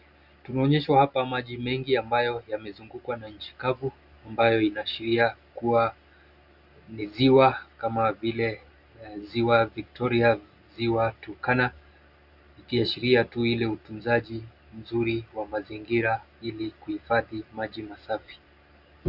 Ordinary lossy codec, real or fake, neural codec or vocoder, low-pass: Opus, 64 kbps; real; none; 5.4 kHz